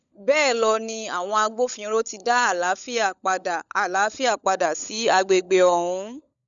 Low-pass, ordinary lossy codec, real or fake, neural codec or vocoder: 7.2 kHz; none; fake; codec, 16 kHz, 16 kbps, FunCodec, trained on LibriTTS, 50 frames a second